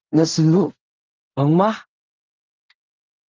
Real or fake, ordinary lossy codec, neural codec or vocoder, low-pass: fake; Opus, 32 kbps; codec, 16 kHz in and 24 kHz out, 0.4 kbps, LongCat-Audio-Codec, fine tuned four codebook decoder; 7.2 kHz